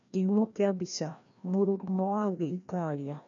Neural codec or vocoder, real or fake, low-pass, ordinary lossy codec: codec, 16 kHz, 1 kbps, FreqCodec, larger model; fake; 7.2 kHz; none